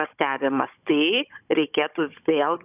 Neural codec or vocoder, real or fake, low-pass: codec, 16 kHz, 16 kbps, FunCodec, trained on Chinese and English, 50 frames a second; fake; 3.6 kHz